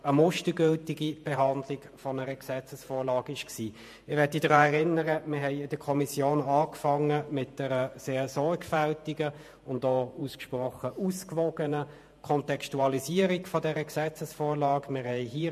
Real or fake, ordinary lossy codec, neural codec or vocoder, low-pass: fake; MP3, 64 kbps; vocoder, 48 kHz, 128 mel bands, Vocos; 14.4 kHz